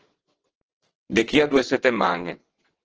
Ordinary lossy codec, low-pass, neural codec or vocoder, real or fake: Opus, 16 kbps; 7.2 kHz; vocoder, 22.05 kHz, 80 mel bands, WaveNeXt; fake